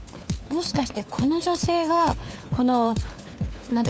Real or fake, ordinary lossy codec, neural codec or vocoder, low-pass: fake; none; codec, 16 kHz, 4 kbps, FunCodec, trained on LibriTTS, 50 frames a second; none